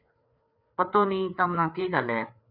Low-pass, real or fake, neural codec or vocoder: 5.4 kHz; fake; codec, 16 kHz, 4 kbps, FreqCodec, larger model